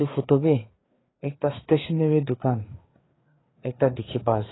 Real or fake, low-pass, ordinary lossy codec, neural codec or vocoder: fake; 7.2 kHz; AAC, 16 kbps; codec, 16 kHz, 4 kbps, FreqCodec, larger model